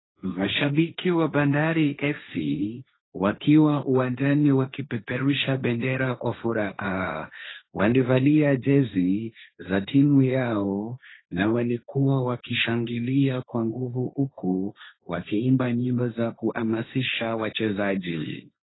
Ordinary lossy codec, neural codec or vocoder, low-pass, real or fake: AAC, 16 kbps; codec, 16 kHz, 1.1 kbps, Voila-Tokenizer; 7.2 kHz; fake